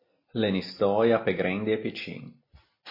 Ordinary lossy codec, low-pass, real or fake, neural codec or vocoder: MP3, 24 kbps; 5.4 kHz; real; none